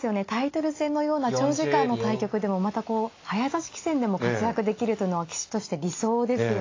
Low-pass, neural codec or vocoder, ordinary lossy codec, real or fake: 7.2 kHz; none; AAC, 32 kbps; real